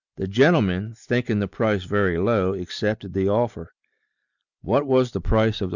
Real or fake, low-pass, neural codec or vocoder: real; 7.2 kHz; none